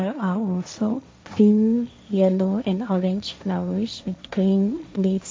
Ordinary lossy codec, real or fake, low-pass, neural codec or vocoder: none; fake; none; codec, 16 kHz, 1.1 kbps, Voila-Tokenizer